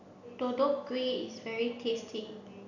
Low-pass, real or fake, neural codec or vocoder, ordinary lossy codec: 7.2 kHz; real; none; none